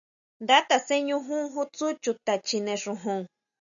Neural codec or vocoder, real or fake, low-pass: none; real; 7.2 kHz